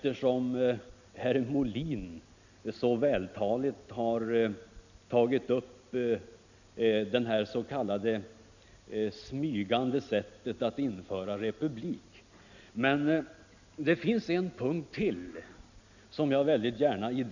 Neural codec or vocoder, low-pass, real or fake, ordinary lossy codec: none; 7.2 kHz; real; none